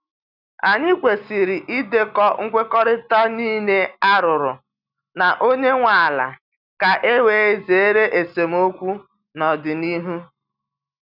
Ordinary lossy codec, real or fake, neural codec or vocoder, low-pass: none; real; none; 5.4 kHz